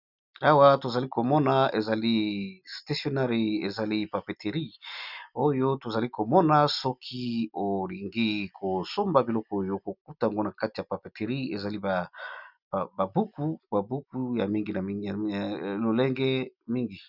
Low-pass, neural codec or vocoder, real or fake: 5.4 kHz; none; real